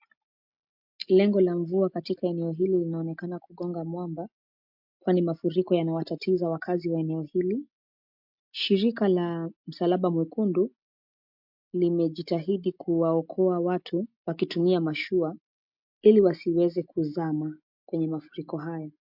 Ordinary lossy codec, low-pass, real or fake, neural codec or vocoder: MP3, 48 kbps; 5.4 kHz; real; none